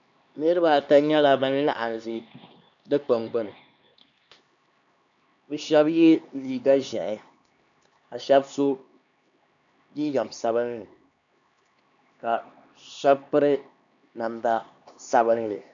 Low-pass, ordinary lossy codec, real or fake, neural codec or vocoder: 7.2 kHz; MP3, 96 kbps; fake; codec, 16 kHz, 2 kbps, X-Codec, HuBERT features, trained on LibriSpeech